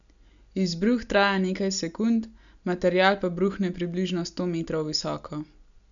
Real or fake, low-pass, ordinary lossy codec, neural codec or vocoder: real; 7.2 kHz; none; none